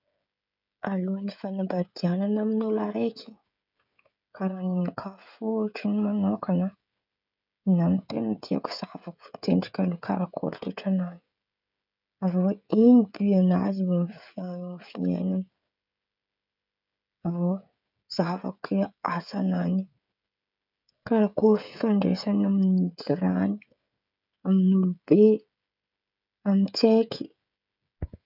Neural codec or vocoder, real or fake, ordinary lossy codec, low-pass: codec, 16 kHz, 16 kbps, FreqCodec, smaller model; fake; none; 5.4 kHz